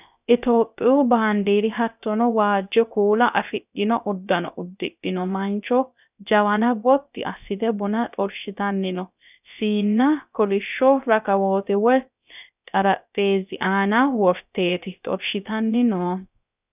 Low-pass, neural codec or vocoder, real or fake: 3.6 kHz; codec, 16 kHz, 0.3 kbps, FocalCodec; fake